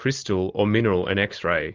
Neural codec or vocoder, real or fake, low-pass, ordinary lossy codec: none; real; 7.2 kHz; Opus, 32 kbps